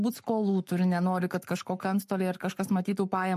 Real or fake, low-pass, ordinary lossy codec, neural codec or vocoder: fake; 14.4 kHz; MP3, 64 kbps; codec, 44.1 kHz, 7.8 kbps, Pupu-Codec